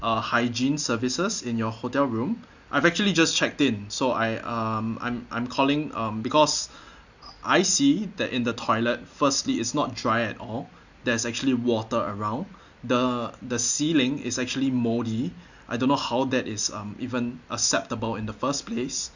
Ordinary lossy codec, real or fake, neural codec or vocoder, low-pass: none; real; none; 7.2 kHz